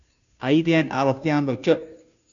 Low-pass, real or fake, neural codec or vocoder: 7.2 kHz; fake; codec, 16 kHz, 0.5 kbps, FunCodec, trained on Chinese and English, 25 frames a second